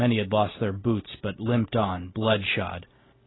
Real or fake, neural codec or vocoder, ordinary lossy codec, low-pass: real; none; AAC, 16 kbps; 7.2 kHz